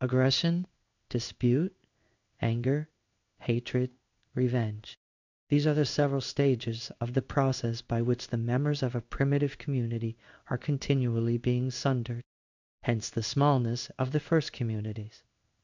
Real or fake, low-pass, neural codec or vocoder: fake; 7.2 kHz; codec, 16 kHz in and 24 kHz out, 1 kbps, XY-Tokenizer